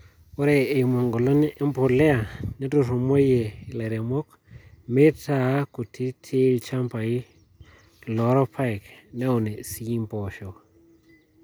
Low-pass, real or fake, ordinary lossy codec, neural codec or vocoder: none; real; none; none